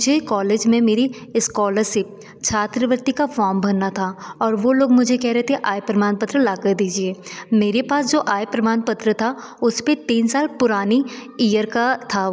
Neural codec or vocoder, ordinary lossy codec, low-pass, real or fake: none; none; none; real